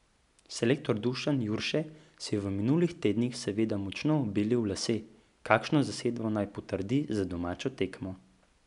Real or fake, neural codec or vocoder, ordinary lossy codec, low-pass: real; none; none; 10.8 kHz